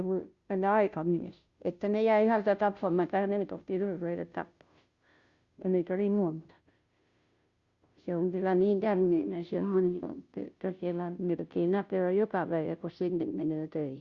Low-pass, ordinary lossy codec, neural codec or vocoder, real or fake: 7.2 kHz; Opus, 64 kbps; codec, 16 kHz, 0.5 kbps, FunCodec, trained on Chinese and English, 25 frames a second; fake